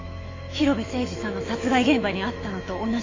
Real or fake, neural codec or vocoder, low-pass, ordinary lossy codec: fake; autoencoder, 48 kHz, 128 numbers a frame, DAC-VAE, trained on Japanese speech; 7.2 kHz; AAC, 32 kbps